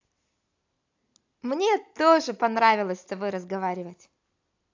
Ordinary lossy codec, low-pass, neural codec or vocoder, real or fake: AAC, 48 kbps; 7.2 kHz; none; real